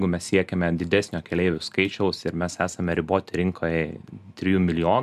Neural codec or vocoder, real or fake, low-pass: none; real; 14.4 kHz